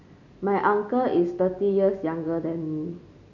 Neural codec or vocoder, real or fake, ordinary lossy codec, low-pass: none; real; none; 7.2 kHz